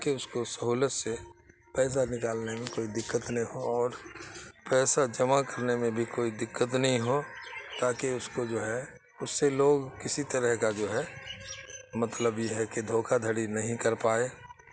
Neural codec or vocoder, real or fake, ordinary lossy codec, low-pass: none; real; none; none